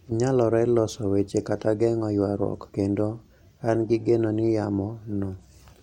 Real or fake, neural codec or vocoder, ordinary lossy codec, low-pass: real; none; MP3, 64 kbps; 19.8 kHz